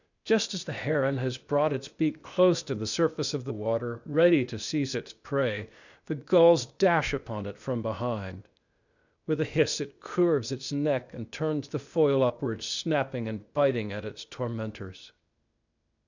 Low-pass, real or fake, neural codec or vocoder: 7.2 kHz; fake; codec, 16 kHz, 0.8 kbps, ZipCodec